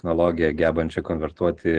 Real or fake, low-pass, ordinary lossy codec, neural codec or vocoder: real; 9.9 kHz; Opus, 16 kbps; none